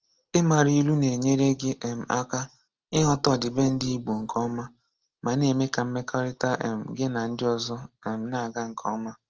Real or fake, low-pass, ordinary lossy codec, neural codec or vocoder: real; 7.2 kHz; Opus, 16 kbps; none